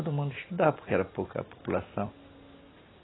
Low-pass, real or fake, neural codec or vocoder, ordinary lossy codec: 7.2 kHz; real; none; AAC, 16 kbps